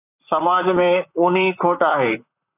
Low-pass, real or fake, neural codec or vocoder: 3.6 kHz; fake; vocoder, 44.1 kHz, 128 mel bands, Pupu-Vocoder